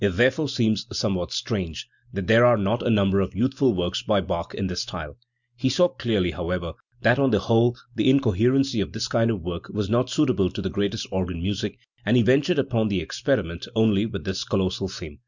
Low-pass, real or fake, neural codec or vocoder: 7.2 kHz; real; none